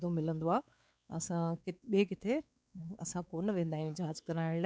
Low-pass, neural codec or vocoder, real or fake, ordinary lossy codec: none; codec, 16 kHz, 4 kbps, X-Codec, WavLM features, trained on Multilingual LibriSpeech; fake; none